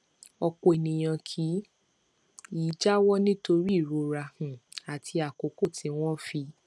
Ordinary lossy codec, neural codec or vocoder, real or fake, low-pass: none; none; real; none